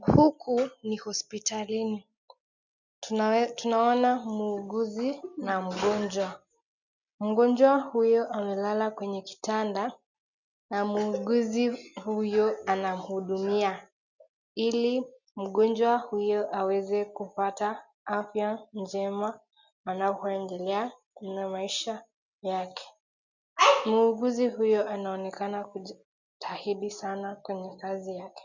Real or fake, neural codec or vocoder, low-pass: real; none; 7.2 kHz